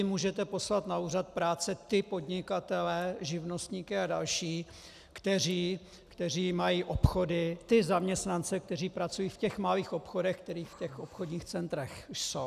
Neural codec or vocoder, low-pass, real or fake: none; 14.4 kHz; real